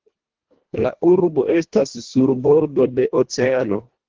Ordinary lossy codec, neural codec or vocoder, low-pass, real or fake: Opus, 16 kbps; codec, 24 kHz, 1.5 kbps, HILCodec; 7.2 kHz; fake